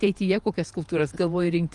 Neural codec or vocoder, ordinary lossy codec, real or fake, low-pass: vocoder, 48 kHz, 128 mel bands, Vocos; Opus, 32 kbps; fake; 10.8 kHz